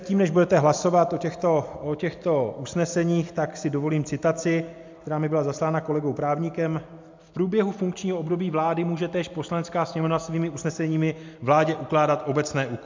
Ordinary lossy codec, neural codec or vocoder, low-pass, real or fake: MP3, 64 kbps; none; 7.2 kHz; real